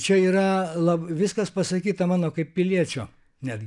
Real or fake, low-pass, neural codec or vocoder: real; 10.8 kHz; none